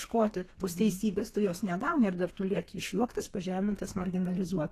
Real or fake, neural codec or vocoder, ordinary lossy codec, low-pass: fake; codec, 32 kHz, 1.9 kbps, SNAC; AAC, 48 kbps; 14.4 kHz